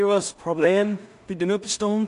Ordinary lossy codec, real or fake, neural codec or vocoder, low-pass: none; fake; codec, 16 kHz in and 24 kHz out, 0.4 kbps, LongCat-Audio-Codec, two codebook decoder; 10.8 kHz